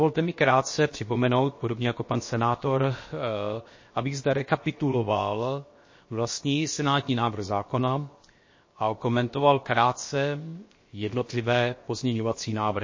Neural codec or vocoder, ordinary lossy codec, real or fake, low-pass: codec, 16 kHz, 0.7 kbps, FocalCodec; MP3, 32 kbps; fake; 7.2 kHz